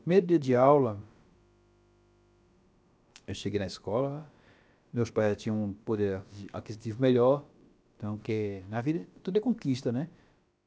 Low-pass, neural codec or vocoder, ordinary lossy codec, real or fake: none; codec, 16 kHz, about 1 kbps, DyCAST, with the encoder's durations; none; fake